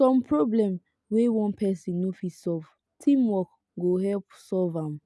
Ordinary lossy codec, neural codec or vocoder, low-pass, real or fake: none; none; none; real